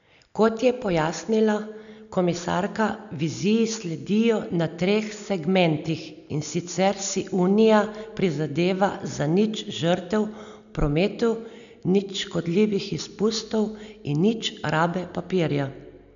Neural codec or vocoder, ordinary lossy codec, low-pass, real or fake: none; none; 7.2 kHz; real